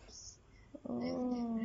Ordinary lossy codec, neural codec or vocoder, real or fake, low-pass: AAC, 24 kbps; none; real; 19.8 kHz